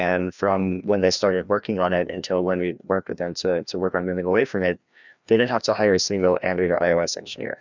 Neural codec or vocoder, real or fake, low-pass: codec, 16 kHz, 1 kbps, FreqCodec, larger model; fake; 7.2 kHz